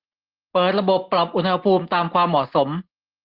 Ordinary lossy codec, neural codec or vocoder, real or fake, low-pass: Opus, 16 kbps; none; real; 5.4 kHz